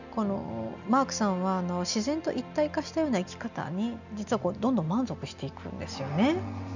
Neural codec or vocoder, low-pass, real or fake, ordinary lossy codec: none; 7.2 kHz; real; none